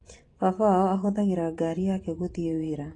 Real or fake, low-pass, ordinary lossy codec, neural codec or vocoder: fake; 10.8 kHz; AAC, 32 kbps; vocoder, 24 kHz, 100 mel bands, Vocos